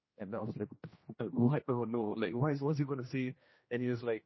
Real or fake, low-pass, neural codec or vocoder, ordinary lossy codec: fake; 7.2 kHz; codec, 16 kHz, 1 kbps, X-Codec, HuBERT features, trained on general audio; MP3, 24 kbps